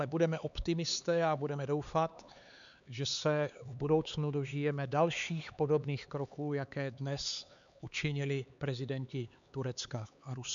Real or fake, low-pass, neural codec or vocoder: fake; 7.2 kHz; codec, 16 kHz, 4 kbps, X-Codec, HuBERT features, trained on LibriSpeech